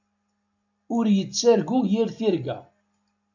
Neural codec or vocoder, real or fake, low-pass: none; real; 7.2 kHz